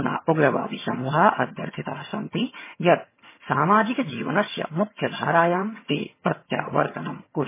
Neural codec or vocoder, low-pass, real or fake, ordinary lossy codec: vocoder, 22.05 kHz, 80 mel bands, HiFi-GAN; 3.6 kHz; fake; MP3, 16 kbps